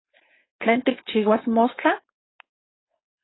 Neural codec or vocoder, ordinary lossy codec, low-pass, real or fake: codec, 16 kHz, 4.8 kbps, FACodec; AAC, 16 kbps; 7.2 kHz; fake